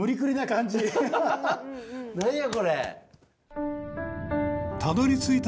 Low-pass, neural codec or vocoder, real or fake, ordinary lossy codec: none; none; real; none